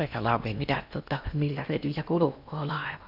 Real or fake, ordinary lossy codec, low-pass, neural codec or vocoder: fake; none; 5.4 kHz; codec, 16 kHz in and 24 kHz out, 0.8 kbps, FocalCodec, streaming, 65536 codes